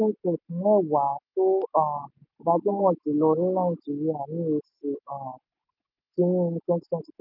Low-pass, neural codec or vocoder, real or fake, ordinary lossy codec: 5.4 kHz; none; real; none